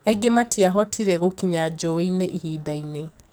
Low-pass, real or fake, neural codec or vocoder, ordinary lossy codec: none; fake; codec, 44.1 kHz, 2.6 kbps, SNAC; none